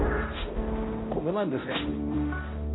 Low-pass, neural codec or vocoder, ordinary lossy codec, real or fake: 7.2 kHz; codec, 16 kHz, 0.5 kbps, X-Codec, HuBERT features, trained on balanced general audio; AAC, 16 kbps; fake